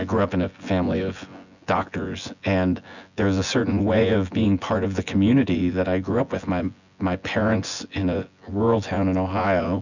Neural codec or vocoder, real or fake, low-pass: vocoder, 24 kHz, 100 mel bands, Vocos; fake; 7.2 kHz